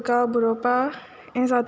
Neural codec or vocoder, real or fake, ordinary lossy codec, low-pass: none; real; none; none